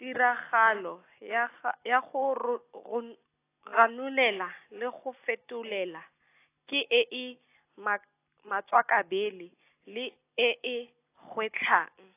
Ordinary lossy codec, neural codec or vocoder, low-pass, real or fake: AAC, 24 kbps; none; 3.6 kHz; real